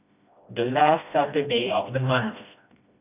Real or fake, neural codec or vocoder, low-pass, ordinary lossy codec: fake; codec, 16 kHz, 1 kbps, FreqCodec, smaller model; 3.6 kHz; none